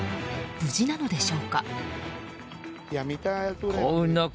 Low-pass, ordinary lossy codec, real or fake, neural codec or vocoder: none; none; real; none